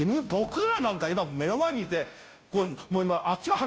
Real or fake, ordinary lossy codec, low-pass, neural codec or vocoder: fake; none; none; codec, 16 kHz, 0.5 kbps, FunCodec, trained on Chinese and English, 25 frames a second